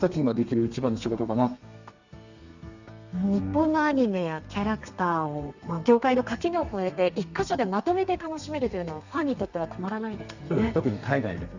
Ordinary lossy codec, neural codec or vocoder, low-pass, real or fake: Opus, 64 kbps; codec, 32 kHz, 1.9 kbps, SNAC; 7.2 kHz; fake